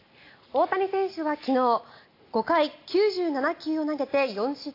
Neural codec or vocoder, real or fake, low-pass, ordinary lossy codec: none; real; 5.4 kHz; AAC, 32 kbps